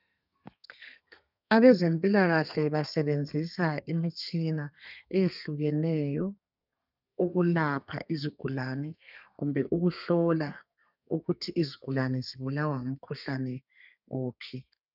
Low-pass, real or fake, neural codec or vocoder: 5.4 kHz; fake; codec, 32 kHz, 1.9 kbps, SNAC